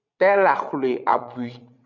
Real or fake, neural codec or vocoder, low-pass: fake; vocoder, 22.05 kHz, 80 mel bands, WaveNeXt; 7.2 kHz